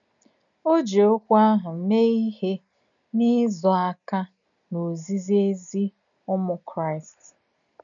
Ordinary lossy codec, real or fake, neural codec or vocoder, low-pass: none; real; none; 7.2 kHz